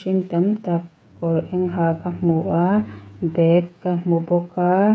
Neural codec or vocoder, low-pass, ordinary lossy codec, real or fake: codec, 16 kHz, 8 kbps, FreqCodec, smaller model; none; none; fake